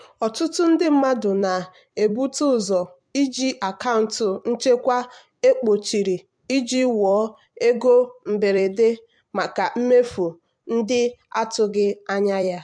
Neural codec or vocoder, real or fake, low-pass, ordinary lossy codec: vocoder, 44.1 kHz, 128 mel bands every 256 samples, BigVGAN v2; fake; 9.9 kHz; MP3, 64 kbps